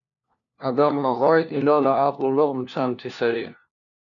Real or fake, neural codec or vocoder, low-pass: fake; codec, 16 kHz, 1 kbps, FunCodec, trained on LibriTTS, 50 frames a second; 7.2 kHz